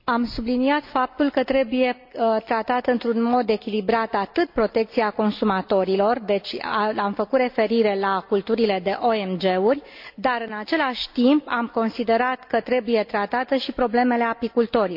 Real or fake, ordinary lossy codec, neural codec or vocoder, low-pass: real; MP3, 48 kbps; none; 5.4 kHz